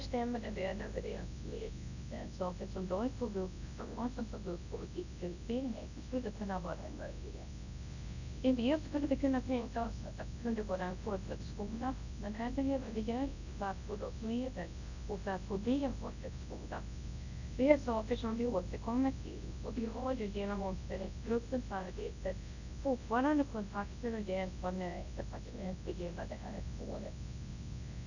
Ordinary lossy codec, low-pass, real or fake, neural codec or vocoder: none; 7.2 kHz; fake; codec, 24 kHz, 0.9 kbps, WavTokenizer, large speech release